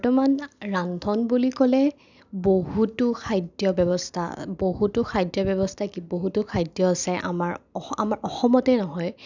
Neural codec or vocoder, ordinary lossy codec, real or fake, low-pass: none; none; real; 7.2 kHz